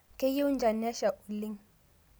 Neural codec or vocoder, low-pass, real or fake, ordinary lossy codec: none; none; real; none